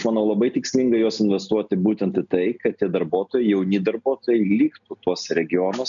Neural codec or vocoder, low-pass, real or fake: none; 7.2 kHz; real